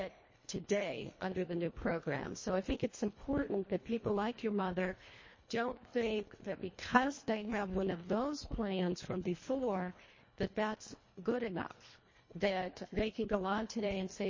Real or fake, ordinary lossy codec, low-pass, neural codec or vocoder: fake; MP3, 32 kbps; 7.2 kHz; codec, 24 kHz, 1.5 kbps, HILCodec